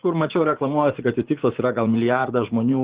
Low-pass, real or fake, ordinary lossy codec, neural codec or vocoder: 3.6 kHz; fake; Opus, 16 kbps; vocoder, 44.1 kHz, 80 mel bands, Vocos